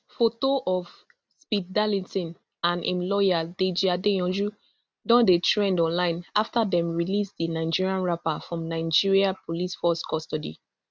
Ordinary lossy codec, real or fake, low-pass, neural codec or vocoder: none; real; none; none